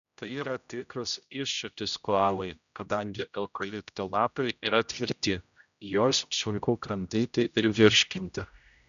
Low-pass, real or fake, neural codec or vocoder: 7.2 kHz; fake; codec, 16 kHz, 0.5 kbps, X-Codec, HuBERT features, trained on general audio